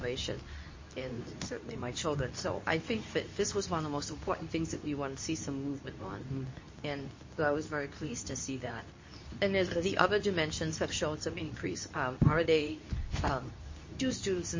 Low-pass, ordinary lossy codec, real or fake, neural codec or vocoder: 7.2 kHz; MP3, 32 kbps; fake; codec, 24 kHz, 0.9 kbps, WavTokenizer, medium speech release version 2